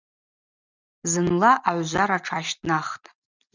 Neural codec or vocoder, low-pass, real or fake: none; 7.2 kHz; real